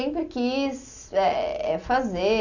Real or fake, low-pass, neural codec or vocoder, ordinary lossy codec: real; 7.2 kHz; none; none